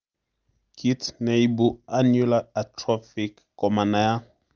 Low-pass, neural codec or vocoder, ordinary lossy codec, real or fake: 7.2 kHz; none; Opus, 32 kbps; real